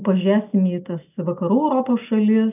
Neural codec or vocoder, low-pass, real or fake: none; 3.6 kHz; real